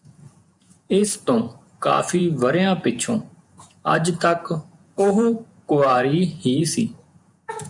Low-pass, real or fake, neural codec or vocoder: 10.8 kHz; fake; vocoder, 48 kHz, 128 mel bands, Vocos